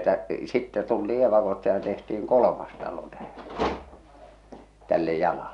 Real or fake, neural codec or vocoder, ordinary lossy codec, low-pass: real; none; none; 10.8 kHz